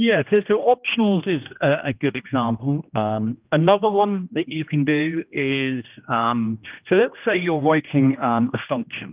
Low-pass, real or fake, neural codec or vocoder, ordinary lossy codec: 3.6 kHz; fake; codec, 16 kHz, 1 kbps, X-Codec, HuBERT features, trained on general audio; Opus, 24 kbps